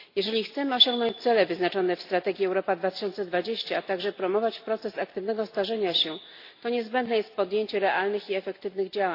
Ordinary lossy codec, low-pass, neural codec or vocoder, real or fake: AAC, 32 kbps; 5.4 kHz; none; real